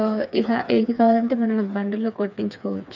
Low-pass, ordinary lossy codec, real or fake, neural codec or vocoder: 7.2 kHz; none; fake; codec, 16 kHz, 4 kbps, FreqCodec, smaller model